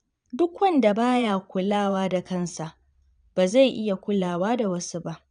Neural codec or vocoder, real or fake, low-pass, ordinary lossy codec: vocoder, 22.05 kHz, 80 mel bands, Vocos; fake; 9.9 kHz; none